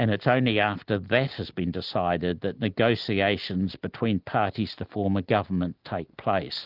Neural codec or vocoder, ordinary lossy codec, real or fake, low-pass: none; Opus, 24 kbps; real; 5.4 kHz